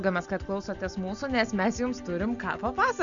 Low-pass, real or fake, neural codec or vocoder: 7.2 kHz; real; none